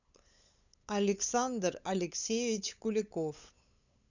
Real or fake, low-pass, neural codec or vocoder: fake; 7.2 kHz; codec, 16 kHz, 8 kbps, FunCodec, trained on LibriTTS, 25 frames a second